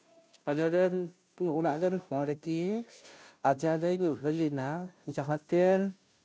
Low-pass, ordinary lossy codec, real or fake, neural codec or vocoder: none; none; fake; codec, 16 kHz, 0.5 kbps, FunCodec, trained on Chinese and English, 25 frames a second